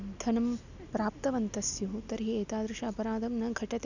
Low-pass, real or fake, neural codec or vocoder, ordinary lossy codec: 7.2 kHz; real; none; none